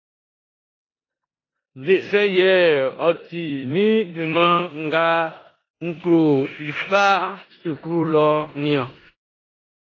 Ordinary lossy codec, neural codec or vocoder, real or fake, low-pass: AAC, 32 kbps; codec, 16 kHz in and 24 kHz out, 0.9 kbps, LongCat-Audio-Codec, four codebook decoder; fake; 7.2 kHz